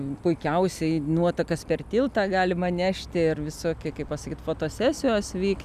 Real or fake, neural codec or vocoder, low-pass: real; none; 14.4 kHz